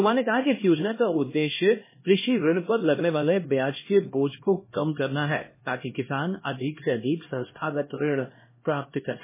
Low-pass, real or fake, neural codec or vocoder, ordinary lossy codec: 3.6 kHz; fake; codec, 16 kHz, 1 kbps, X-Codec, HuBERT features, trained on LibriSpeech; MP3, 16 kbps